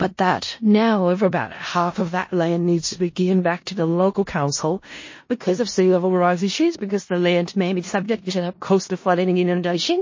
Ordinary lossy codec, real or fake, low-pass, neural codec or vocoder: MP3, 32 kbps; fake; 7.2 kHz; codec, 16 kHz in and 24 kHz out, 0.4 kbps, LongCat-Audio-Codec, four codebook decoder